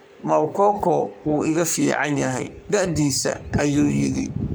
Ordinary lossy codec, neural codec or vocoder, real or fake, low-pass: none; codec, 44.1 kHz, 3.4 kbps, Pupu-Codec; fake; none